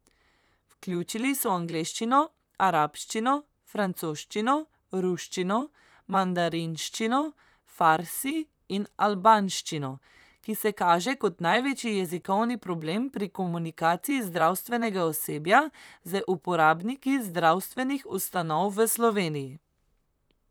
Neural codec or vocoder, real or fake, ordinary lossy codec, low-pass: vocoder, 44.1 kHz, 128 mel bands, Pupu-Vocoder; fake; none; none